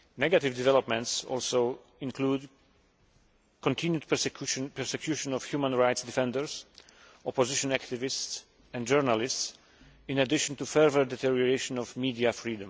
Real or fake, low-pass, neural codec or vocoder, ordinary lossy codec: real; none; none; none